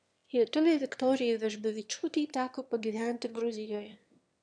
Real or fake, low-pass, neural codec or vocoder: fake; 9.9 kHz; autoencoder, 22.05 kHz, a latent of 192 numbers a frame, VITS, trained on one speaker